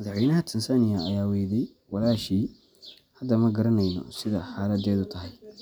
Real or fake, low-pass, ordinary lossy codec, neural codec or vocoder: real; none; none; none